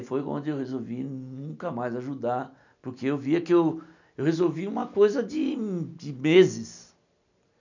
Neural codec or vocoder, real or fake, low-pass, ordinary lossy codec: none; real; 7.2 kHz; none